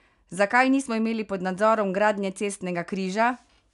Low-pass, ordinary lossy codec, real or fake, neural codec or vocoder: 10.8 kHz; none; real; none